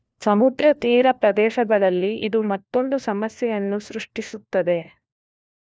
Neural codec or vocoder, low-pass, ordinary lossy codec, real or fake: codec, 16 kHz, 1 kbps, FunCodec, trained on LibriTTS, 50 frames a second; none; none; fake